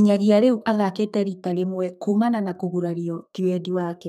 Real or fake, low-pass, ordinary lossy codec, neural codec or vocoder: fake; 14.4 kHz; none; codec, 32 kHz, 1.9 kbps, SNAC